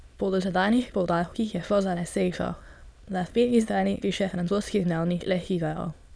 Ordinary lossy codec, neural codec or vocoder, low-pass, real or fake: none; autoencoder, 22.05 kHz, a latent of 192 numbers a frame, VITS, trained on many speakers; none; fake